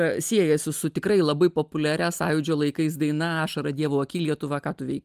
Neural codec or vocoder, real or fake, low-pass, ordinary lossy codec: none; real; 14.4 kHz; Opus, 32 kbps